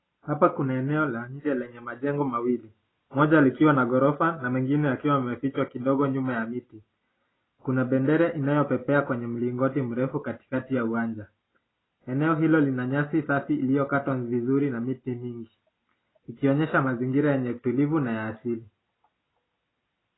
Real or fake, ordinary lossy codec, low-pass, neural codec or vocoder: real; AAC, 16 kbps; 7.2 kHz; none